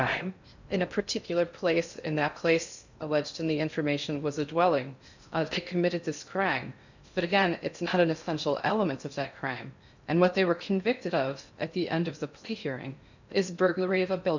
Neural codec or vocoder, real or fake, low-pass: codec, 16 kHz in and 24 kHz out, 0.6 kbps, FocalCodec, streaming, 4096 codes; fake; 7.2 kHz